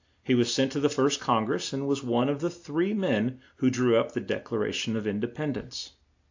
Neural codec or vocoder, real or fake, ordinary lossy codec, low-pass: none; real; AAC, 48 kbps; 7.2 kHz